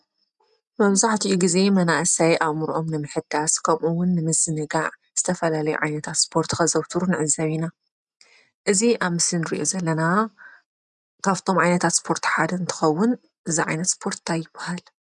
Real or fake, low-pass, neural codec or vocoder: real; 10.8 kHz; none